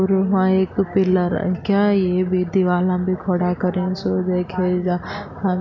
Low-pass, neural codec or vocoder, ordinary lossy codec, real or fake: 7.2 kHz; none; none; real